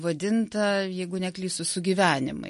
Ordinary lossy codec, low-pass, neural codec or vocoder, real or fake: MP3, 48 kbps; 14.4 kHz; none; real